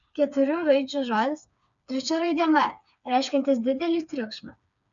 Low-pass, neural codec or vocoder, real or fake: 7.2 kHz; codec, 16 kHz, 4 kbps, FreqCodec, smaller model; fake